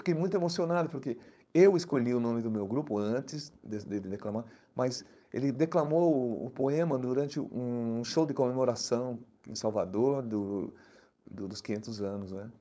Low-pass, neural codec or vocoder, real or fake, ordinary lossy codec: none; codec, 16 kHz, 4.8 kbps, FACodec; fake; none